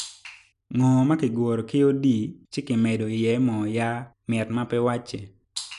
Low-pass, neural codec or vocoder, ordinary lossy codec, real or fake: 10.8 kHz; none; none; real